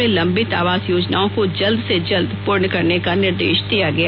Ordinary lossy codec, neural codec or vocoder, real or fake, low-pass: Opus, 64 kbps; none; real; 5.4 kHz